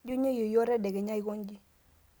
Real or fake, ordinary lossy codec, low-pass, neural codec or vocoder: real; none; none; none